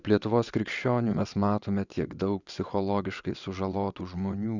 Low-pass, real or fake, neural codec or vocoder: 7.2 kHz; fake; vocoder, 22.05 kHz, 80 mel bands, WaveNeXt